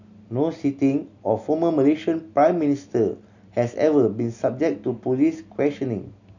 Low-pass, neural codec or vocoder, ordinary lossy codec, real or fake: 7.2 kHz; none; none; real